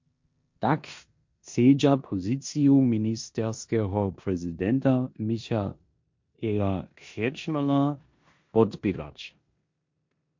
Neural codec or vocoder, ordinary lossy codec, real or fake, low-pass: codec, 16 kHz in and 24 kHz out, 0.9 kbps, LongCat-Audio-Codec, four codebook decoder; MP3, 48 kbps; fake; 7.2 kHz